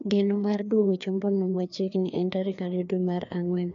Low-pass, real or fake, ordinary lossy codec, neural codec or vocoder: 7.2 kHz; fake; none; codec, 16 kHz, 2 kbps, FreqCodec, larger model